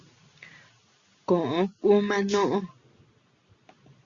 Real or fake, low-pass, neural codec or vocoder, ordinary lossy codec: real; 7.2 kHz; none; Opus, 64 kbps